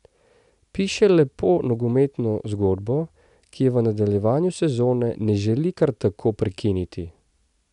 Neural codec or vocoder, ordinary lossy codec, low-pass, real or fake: none; none; 10.8 kHz; real